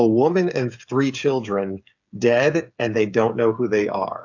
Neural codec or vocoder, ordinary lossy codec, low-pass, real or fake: codec, 16 kHz, 8 kbps, FreqCodec, smaller model; AAC, 48 kbps; 7.2 kHz; fake